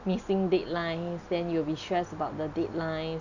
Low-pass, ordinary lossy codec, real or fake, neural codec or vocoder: 7.2 kHz; none; real; none